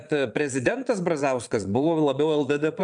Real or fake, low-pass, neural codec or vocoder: fake; 9.9 kHz; vocoder, 22.05 kHz, 80 mel bands, Vocos